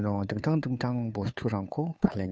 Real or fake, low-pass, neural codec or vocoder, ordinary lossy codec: fake; none; codec, 16 kHz, 2 kbps, FunCodec, trained on Chinese and English, 25 frames a second; none